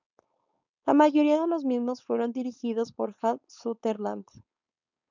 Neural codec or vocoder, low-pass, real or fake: codec, 16 kHz, 4.8 kbps, FACodec; 7.2 kHz; fake